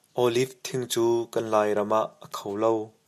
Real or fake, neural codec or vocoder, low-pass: real; none; 14.4 kHz